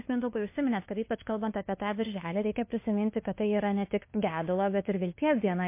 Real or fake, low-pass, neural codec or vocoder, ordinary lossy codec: fake; 3.6 kHz; codec, 16 kHz, 2 kbps, FunCodec, trained on LibriTTS, 25 frames a second; MP3, 24 kbps